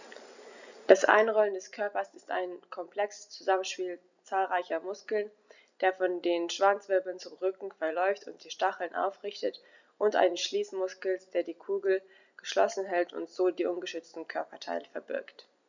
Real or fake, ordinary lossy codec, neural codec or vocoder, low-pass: real; none; none; 7.2 kHz